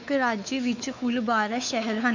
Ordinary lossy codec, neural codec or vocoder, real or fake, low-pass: none; codec, 16 kHz, 4 kbps, X-Codec, WavLM features, trained on Multilingual LibriSpeech; fake; 7.2 kHz